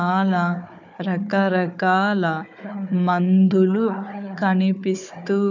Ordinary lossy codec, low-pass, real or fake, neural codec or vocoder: none; 7.2 kHz; fake; codec, 16 kHz, 4 kbps, FunCodec, trained on Chinese and English, 50 frames a second